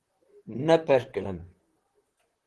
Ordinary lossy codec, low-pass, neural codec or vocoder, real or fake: Opus, 16 kbps; 10.8 kHz; vocoder, 44.1 kHz, 128 mel bands, Pupu-Vocoder; fake